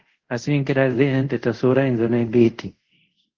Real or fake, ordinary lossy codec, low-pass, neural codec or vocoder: fake; Opus, 16 kbps; 7.2 kHz; codec, 24 kHz, 0.5 kbps, DualCodec